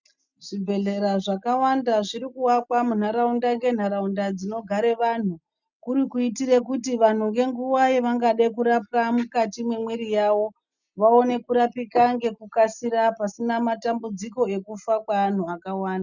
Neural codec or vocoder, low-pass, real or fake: none; 7.2 kHz; real